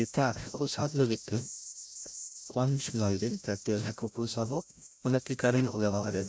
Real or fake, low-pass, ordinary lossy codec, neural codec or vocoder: fake; none; none; codec, 16 kHz, 0.5 kbps, FreqCodec, larger model